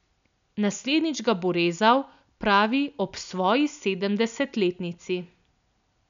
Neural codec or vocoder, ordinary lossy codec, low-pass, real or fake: none; none; 7.2 kHz; real